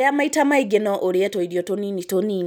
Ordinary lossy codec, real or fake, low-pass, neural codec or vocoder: none; real; none; none